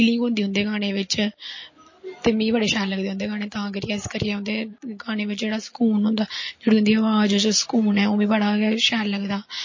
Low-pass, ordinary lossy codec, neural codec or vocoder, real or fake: 7.2 kHz; MP3, 32 kbps; none; real